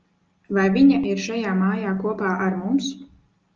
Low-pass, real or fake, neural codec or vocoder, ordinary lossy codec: 7.2 kHz; real; none; Opus, 24 kbps